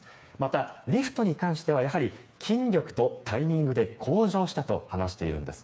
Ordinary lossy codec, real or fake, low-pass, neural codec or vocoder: none; fake; none; codec, 16 kHz, 4 kbps, FreqCodec, smaller model